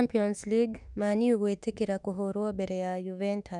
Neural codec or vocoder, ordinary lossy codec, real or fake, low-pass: autoencoder, 48 kHz, 32 numbers a frame, DAC-VAE, trained on Japanese speech; none; fake; 10.8 kHz